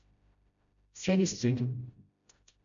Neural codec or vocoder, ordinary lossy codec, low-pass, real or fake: codec, 16 kHz, 0.5 kbps, FreqCodec, smaller model; MP3, 96 kbps; 7.2 kHz; fake